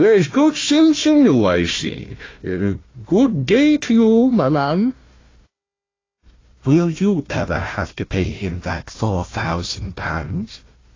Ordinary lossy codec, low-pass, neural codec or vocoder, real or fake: AAC, 32 kbps; 7.2 kHz; codec, 16 kHz, 1 kbps, FunCodec, trained on Chinese and English, 50 frames a second; fake